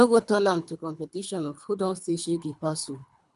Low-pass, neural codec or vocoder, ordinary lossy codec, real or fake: 10.8 kHz; codec, 24 kHz, 3 kbps, HILCodec; none; fake